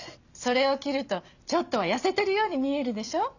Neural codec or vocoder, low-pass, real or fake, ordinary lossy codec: none; 7.2 kHz; real; none